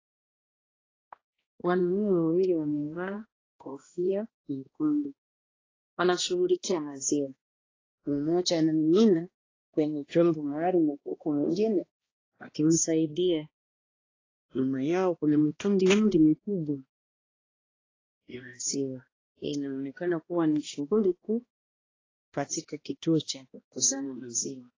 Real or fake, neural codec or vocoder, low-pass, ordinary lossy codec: fake; codec, 16 kHz, 1 kbps, X-Codec, HuBERT features, trained on balanced general audio; 7.2 kHz; AAC, 32 kbps